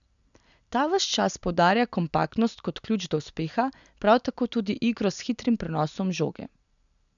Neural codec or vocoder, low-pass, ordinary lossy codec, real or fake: none; 7.2 kHz; none; real